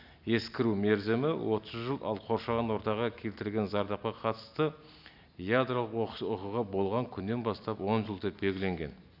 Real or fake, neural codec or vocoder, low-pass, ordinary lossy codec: real; none; 5.4 kHz; none